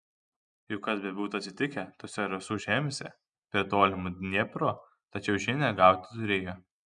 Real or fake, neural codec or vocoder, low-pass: real; none; 9.9 kHz